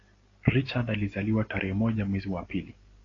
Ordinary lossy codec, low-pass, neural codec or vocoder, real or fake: AAC, 32 kbps; 7.2 kHz; none; real